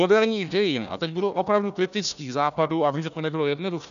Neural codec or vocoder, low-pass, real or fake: codec, 16 kHz, 1 kbps, FunCodec, trained on Chinese and English, 50 frames a second; 7.2 kHz; fake